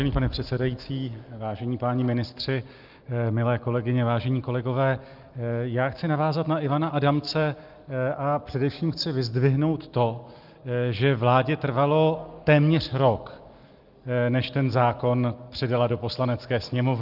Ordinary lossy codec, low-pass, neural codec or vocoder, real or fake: Opus, 24 kbps; 5.4 kHz; none; real